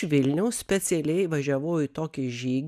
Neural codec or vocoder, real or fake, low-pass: none; real; 14.4 kHz